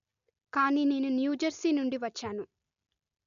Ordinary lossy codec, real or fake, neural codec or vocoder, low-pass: none; real; none; 7.2 kHz